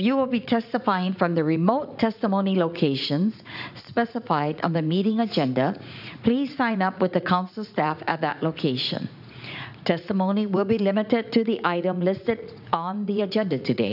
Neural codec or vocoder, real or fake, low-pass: vocoder, 44.1 kHz, 80 mel bands, Vocos; fake; 5.4 kHz